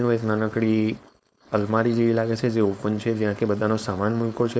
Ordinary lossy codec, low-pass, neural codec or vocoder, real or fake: none; none; codec, 16 kHz, 4.8 kbps, FACodec; fake